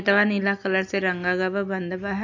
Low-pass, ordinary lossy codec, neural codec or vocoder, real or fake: 7.2 kHz; AAC, 48 kbps; none; real